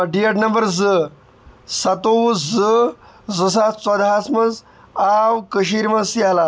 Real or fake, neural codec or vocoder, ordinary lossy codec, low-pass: real; none; none; none